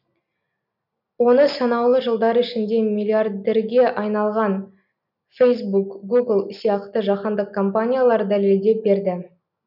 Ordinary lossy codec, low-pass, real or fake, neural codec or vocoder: none; 5.4 kHz; real; none